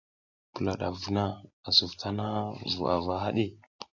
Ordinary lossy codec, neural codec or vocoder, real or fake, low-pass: AAC, 48 kbps; none; real; 7.2 kHz